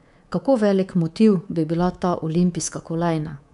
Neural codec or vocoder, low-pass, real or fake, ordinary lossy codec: codec, 24 kHz, 3.1 kbps, DualCodec; 10.8 kHz; fake; none